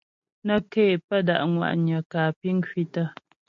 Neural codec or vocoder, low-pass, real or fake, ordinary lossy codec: none; 7.2 kHz; real; MP3, 48 kbps